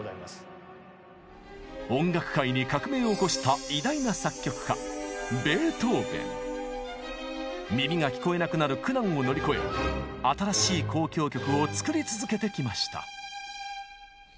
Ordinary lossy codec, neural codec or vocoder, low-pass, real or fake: none; none; none; real